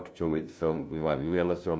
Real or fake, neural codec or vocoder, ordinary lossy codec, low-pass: fake; codec, 16 kHz, 0.5 kbps, FunCodec, trained on LibriTTS, 25 frames a second; none; none